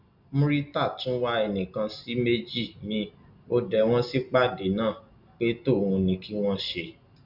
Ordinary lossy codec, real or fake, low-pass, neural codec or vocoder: none; real; 5.4 kHz; none